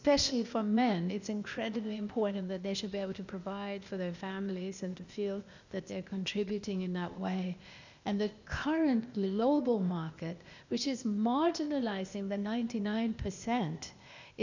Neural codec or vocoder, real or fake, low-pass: codec, 16 kHz, 0.8 kbps, ZipCodec; fake; 7.2 kHz